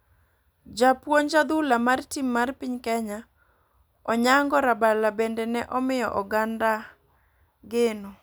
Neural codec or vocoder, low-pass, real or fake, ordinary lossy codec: none; none; real; none